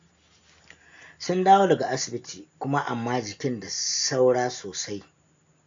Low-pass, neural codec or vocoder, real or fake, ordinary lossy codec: 7.2 kHz; none; real; AAC, 64 kbps